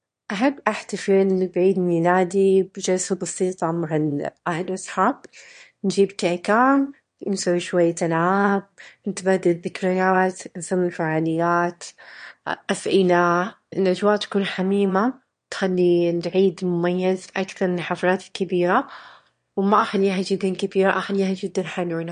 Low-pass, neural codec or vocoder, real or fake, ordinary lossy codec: 9.9 kHz; autoencoder, 22.05 kHz, a latent of 192 numbers a frame, VITS, trained on one speaker; fake; MP3, 48 kbps